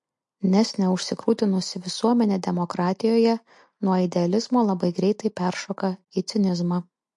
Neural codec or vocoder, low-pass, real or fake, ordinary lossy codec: none; 10.8 kHz; real; MP3, 48 kbps